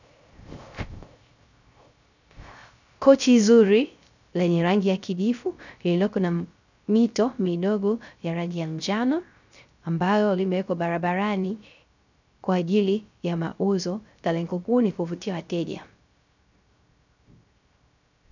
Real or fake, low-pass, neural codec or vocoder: fake; 7.2 kHz; codec, 16 kHz, 0.3 kbps, FocalCodec